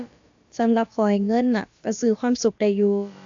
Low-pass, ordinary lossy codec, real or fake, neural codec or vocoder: 7.2 kHz; AAC, 64 kbps; fake; codec, 16 kHz, about 1 kbps, DyCAST, with the encoder's durations